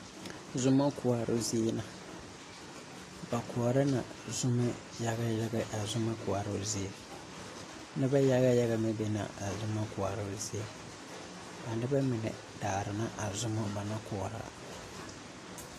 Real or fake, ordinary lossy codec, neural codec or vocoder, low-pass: real; AAC, 48 kbps; none; 14.4 kHz